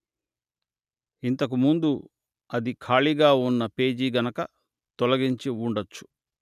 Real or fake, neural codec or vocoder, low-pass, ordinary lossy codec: real; none; 14.4 kHz; none